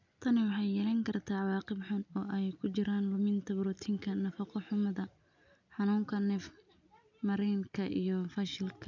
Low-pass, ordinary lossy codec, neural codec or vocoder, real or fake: 7.2 kHz; none; none; real